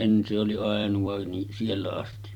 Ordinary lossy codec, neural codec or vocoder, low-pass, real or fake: none; vocoder, 44.1 kHz, 128 mel bands every 256 samples, BigVGAN v2; 19.8 kHz; fake